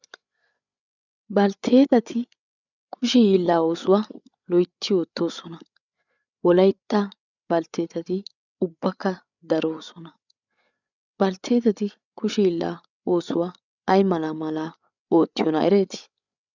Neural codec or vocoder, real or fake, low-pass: codec, 16 kHz, 8 kbps, FreqCodec, larger model; fake; 7.2 kHz